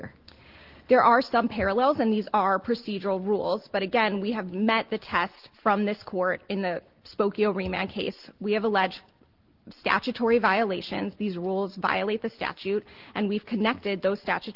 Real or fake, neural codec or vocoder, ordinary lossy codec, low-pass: real; none; Opus, 16 kbps; 5.4 kHz